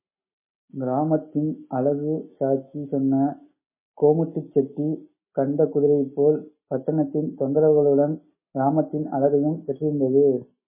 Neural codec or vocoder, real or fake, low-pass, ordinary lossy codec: none; real; 3.6 kHz; MP3, 16 kbps